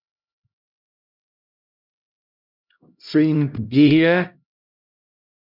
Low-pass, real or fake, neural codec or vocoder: 5.4 kHz; fake; codec, 16 kHz, 0.5 kbps, X-Codec, HuBERT features, trained on LibriSpeech